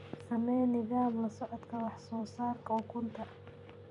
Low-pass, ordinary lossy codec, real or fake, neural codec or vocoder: 10.8 kHz; none; fake; vocoder, 44.1 kHz, 128 mel bands every 256 samples, BigVGAN v2